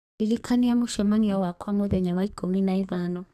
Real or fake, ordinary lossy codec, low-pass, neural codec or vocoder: fake; none; 14.4 kHz; codec, 32 kHz, 1.9 kbps, SNAC